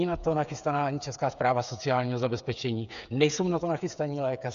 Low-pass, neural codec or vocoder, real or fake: 7.2 kHz; codec, 16 kHz, 8 kbps, FreqCodec, smaller model; fake